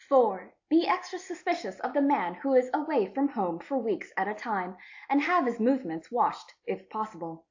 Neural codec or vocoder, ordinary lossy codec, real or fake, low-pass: none; AAC, 48 kbps; real; 7.2 kHz